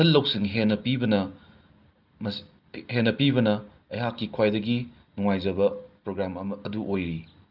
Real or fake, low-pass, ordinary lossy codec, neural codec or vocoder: real; 5.4 kHz; Opus, 32 kbps; none